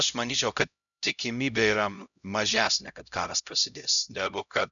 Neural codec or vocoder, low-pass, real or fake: codec, 16 kHz, 0.5 kbps, X-Codec, HuBERT features, trained on LibriSpeech; 7.2 kHz; fake